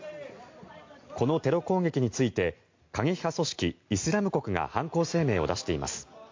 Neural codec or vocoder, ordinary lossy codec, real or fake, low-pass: none; MP3, 48 kbps; real; 7.2 kHz